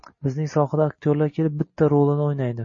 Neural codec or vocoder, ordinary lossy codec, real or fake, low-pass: none; MP3, 32 kbps; real; 7.2 kHz